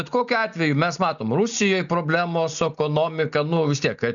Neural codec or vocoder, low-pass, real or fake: none; 7.2 kHz; real